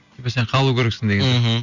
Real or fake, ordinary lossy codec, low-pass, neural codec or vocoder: real; none; 7.2 kHz; none